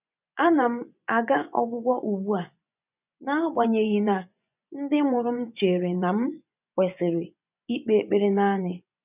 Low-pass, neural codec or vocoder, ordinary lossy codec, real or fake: 3.6 kHz; vocoder, 24 kHz, 100 mel bands, Vocos; none; fake